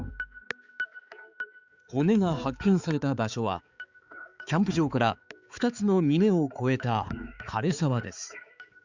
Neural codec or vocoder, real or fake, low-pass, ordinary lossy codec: codec, 16 kHz, 4 kbps, X-Codec, HuBERT features, trained on balanced general audio; fake; 7.2 kHz; Opus, 64 kbps